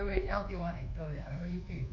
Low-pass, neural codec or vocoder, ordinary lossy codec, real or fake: 7.2 kHz; codec, 24 kHz, 1.2 kbps, DualCodec; none; fake